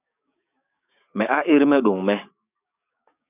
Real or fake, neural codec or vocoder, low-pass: fake; codec, 16 kHz, 6 kbps, DAC; 3.6 kHz